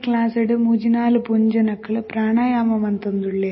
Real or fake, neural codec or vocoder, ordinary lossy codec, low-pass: real; none; MP3, 24 kbps; 7.2 kHz